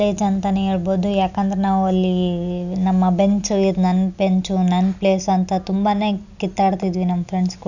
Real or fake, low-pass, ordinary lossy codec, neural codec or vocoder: real; 7.2 kHz; none; none